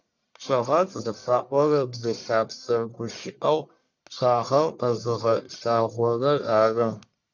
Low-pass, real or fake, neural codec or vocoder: 7.2 kHz; fake; codec, 44.1 kHz, 1.7 kbps, Pupu-Codec